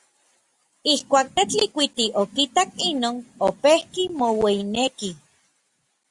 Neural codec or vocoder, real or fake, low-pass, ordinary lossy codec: none; real; 10.8 kHz; Opus, 64 kbps